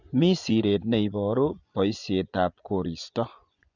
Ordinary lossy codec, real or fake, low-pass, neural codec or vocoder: none; fake; 7.2 kHz; vocoder, 24 kHz, 100 mel bands, Vocos